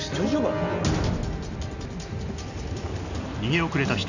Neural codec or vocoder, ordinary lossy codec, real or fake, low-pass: none; none; real; 7.2 kHz